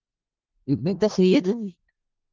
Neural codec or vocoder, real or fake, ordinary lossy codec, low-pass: codec, 16 kHz in and 24 kHz out, 0.4 kbps, LongCat-Audio-Codec, four codebook decoder; fake; Opus, 24 kbps; 7.2 kHz